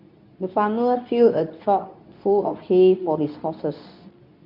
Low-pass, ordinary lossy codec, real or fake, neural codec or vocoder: 5.4 kHz; none; fake; codec, 24 kHz, 0.9 kbps, WavTokenizer, medium speech release version 2